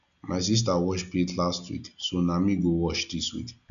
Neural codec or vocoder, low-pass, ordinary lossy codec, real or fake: none; 7.2 kHz; none; real